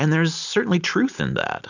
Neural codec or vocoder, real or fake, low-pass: none; real; 7.2 kHz